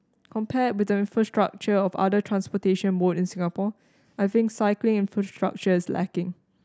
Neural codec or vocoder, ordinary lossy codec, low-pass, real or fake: none; none; none; real